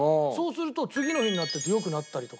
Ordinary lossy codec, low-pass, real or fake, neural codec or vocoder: none; none; real; none